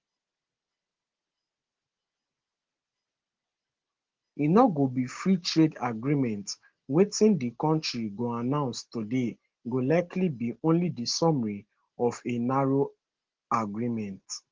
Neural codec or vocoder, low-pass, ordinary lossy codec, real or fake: none; 7.2 kHz; Opus, 16 kbps; real